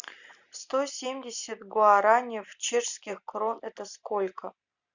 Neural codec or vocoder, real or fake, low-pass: none; real; 7.2 kHz